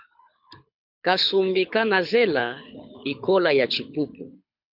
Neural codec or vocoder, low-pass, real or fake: codec, 24 kHz, 6 kbps, HILCodec; 5.4 kHz; fake